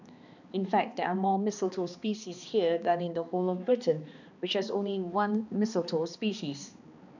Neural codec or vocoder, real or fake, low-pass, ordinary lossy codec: codec, 16 kHz, 2 kbps, X-Codec, HuBERT features, trained on balanced general audio; fake; 7.2 kHz; none